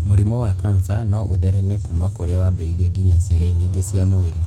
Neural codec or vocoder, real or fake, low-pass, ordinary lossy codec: codec, 44.1 kHz, 2.6 kbps, DAC; fake; 19.8 kHz; none